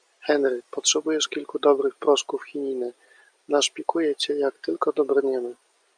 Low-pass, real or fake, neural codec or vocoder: 9.9 kHz; real; none